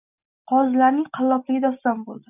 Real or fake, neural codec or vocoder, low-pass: real; none; 3.6 kHz